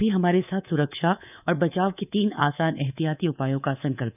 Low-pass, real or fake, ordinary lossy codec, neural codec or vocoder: 3.6 kHz; fake; none; codec, 24 kHz, 3.1 kbps, DualCodec